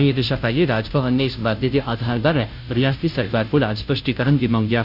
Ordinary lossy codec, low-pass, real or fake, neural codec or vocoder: none; 5.4 kHz; fake; codec, 16 kHz, 0.5 kbps, FunCodec, trained on Chinese and English, 25 frames a second